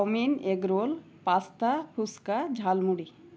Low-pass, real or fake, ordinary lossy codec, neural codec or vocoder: none; real; none; none